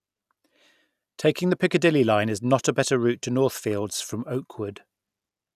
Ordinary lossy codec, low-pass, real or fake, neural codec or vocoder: none; 14.4 kHz; real; none